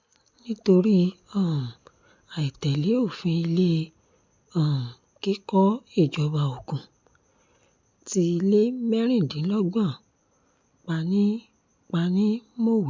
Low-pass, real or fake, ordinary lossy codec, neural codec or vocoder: 7.2 kHz; real; MP3, 64 kbps; none